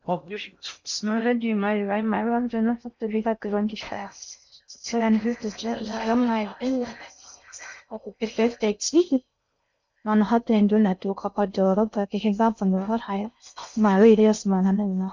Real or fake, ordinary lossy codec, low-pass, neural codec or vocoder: fake; MP3, 64 kbps; 7.2 kHz; codec, 16 kHz in and 24 kHz out, 0.6 kbps, FocalCodec, streaming, 4096 codes